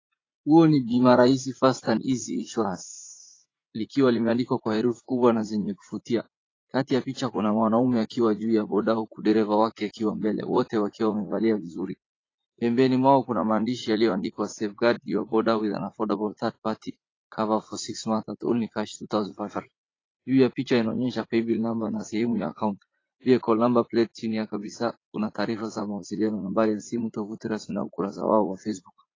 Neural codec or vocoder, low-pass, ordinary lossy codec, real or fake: vocoder, 44.1 kHz, 80 mel bands, Vocos; 7.2 kHz; AAC, 32 kbps; fake